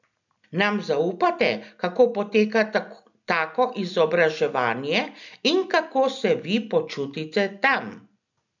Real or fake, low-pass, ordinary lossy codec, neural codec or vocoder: real; 7.2 kHz; none; none